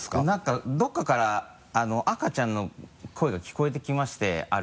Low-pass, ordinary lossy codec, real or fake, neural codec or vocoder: none; none; real; none